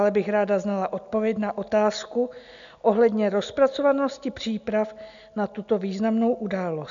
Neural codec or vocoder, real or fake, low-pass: none; real; 7.2 kHz